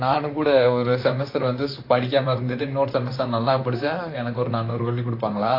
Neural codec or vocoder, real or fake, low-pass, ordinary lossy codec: vocoder, 44.1 kHz, 128 mel bands, Pupu-Vocoder; fake; 5.4 kHz; AAC, 32 kbps